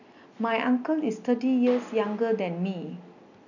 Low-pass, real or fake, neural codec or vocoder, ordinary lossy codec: 7.2 kHz; real; none; none